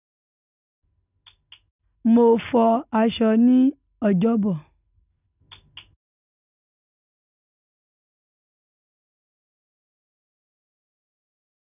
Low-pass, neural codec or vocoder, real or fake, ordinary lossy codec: 3.6 kHz; none; real; none